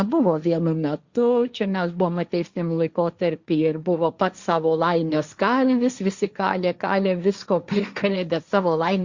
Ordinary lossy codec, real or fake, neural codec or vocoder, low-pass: Opus, 64 kbps; fake; codec, 16 kHz, 1.1 kbps, Voila-Tokenizer; 7.2 kHz